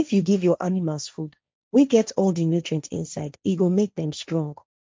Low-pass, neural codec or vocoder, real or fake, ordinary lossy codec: none; codec, 16 kHz, 1.1 kbps, Voila-Tokenizer; fake; none